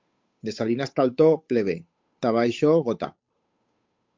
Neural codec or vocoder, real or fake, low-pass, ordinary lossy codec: codec, 16 kHz, 8 kbps, FunCodec, trained on Chinese and English, 25 frames a second; fake; 7.2 kHz; MP3, 48 kbps